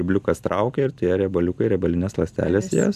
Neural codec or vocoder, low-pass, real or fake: none; 14.4 kHz; real